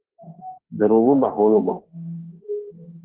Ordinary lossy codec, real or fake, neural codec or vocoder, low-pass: Opus, 16 kbps; fake; autoencoder, 48 kHz, 32 numbers a frame, DAC-VAE, trained on Japanese speech; 3.6 kHz